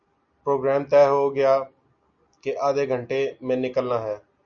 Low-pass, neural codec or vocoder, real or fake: 7.2 kHz; none; real